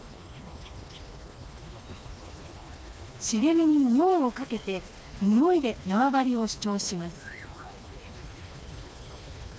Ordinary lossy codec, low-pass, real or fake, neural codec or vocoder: none; none; fake; codec, 16 kHz, 2 kbps, FreqCodec, smaller model